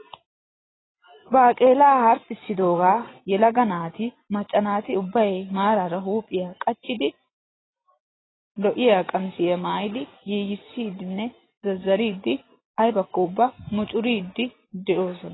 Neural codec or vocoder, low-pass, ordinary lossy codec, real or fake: none; 7.2 kHz; AAC, 16 kbps; real